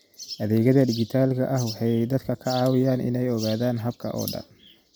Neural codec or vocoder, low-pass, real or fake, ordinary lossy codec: none; none; real; none